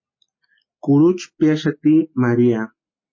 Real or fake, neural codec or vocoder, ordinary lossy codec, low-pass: real; none; MP3, 32 kbps; 7.2 kHz